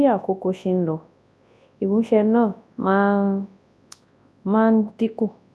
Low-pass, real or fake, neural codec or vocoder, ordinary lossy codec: none; fake; codec, 24 kHz, 0.9 kbps, WavTokenizer, large speech release; none